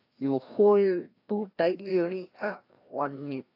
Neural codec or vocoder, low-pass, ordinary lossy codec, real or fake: codec, 16 kHz, 1 kbps, FreqCodec, larger model; 5.4 kHz; AAC, 32 kbps; fake